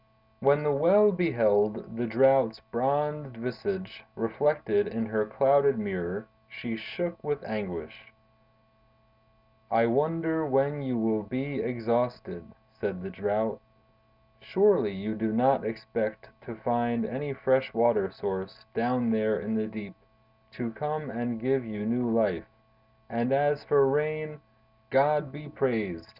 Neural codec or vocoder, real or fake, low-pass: none; real; 5.4 kHz